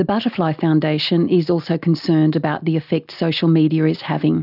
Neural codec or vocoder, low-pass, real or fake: none; 5.4 kHz; real